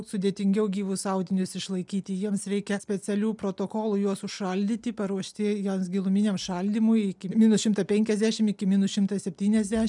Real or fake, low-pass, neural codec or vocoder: real; 10.8 kHz; none